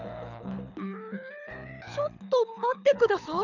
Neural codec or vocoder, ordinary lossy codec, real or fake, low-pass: codec, 24 kHz, 6 kbps, HILCodec; none; fake; 7.2 kHz